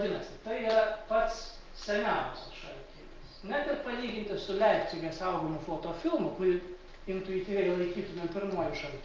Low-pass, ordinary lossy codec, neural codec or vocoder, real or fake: 7.2 kHz; Opus, 24 kbps; none; real